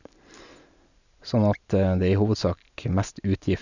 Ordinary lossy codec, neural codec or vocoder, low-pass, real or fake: none; none; 7.2 kHz; real